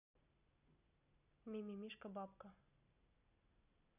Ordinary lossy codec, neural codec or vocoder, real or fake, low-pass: none; none; real; 3.6 kHz